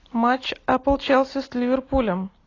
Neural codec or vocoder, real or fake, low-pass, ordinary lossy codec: none; real; 7.2 kHz; AAC, 32 kbps